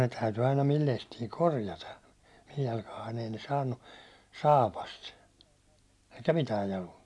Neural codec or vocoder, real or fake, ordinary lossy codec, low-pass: none; real; none; none